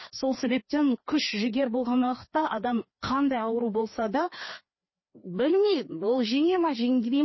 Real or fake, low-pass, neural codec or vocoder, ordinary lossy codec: fake; 7.2 kHz; codec, 16 kHz, 2 kbps, FreqCodec, larger model; MP3, 24 kbps